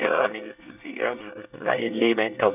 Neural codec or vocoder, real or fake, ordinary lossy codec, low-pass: codec, 24 kHz, 1 kbps, SNAC; fake; none; 3.6 kHz